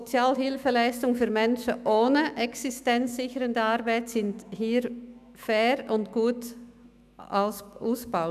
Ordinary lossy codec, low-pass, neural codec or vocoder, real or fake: none; 14.4 kHz; autoencoder, 48 kHz, 128 numbers a frame, DAC-VAE, trained on Japanese speech; fake